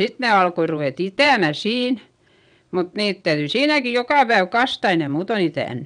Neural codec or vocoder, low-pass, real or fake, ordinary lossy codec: vocoder, 22.05 kHz, 80 mel bands, WaveNeXt; 9.9 kHz; fake; none